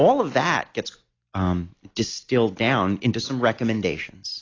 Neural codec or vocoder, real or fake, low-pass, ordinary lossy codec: none; real; 7.2 kHz; AAC, 32 kbps